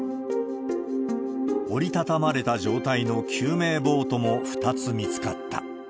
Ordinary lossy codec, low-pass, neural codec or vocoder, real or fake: none; none; none; real